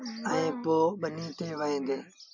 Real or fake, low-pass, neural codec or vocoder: real; 7.2 kHz; none